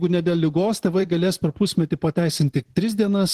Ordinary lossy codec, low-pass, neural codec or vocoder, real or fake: Opus, 16 kbps; 14.4 kHz; vocoder, 44.1 kHz, 128 mel bands every 512 samples, BigVGAN v2; fake